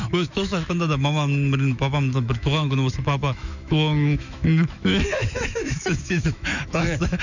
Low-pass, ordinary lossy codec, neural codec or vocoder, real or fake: 7.2 kHz; none; autoencoder, 48 kHz, 128 numbers a frame, DAC-VAE, trained on Japanese speech; fake